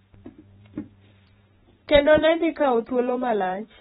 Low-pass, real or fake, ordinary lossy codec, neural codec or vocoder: 19.8 kHz; fake; AAC, 16 kbps; vocoder, 44.1 kHz, 128 mel bands every 512 samples, BigVGAN v2